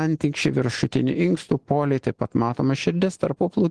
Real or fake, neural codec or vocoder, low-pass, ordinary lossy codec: real; none; 10.8 kHz; Opus, 16 kbps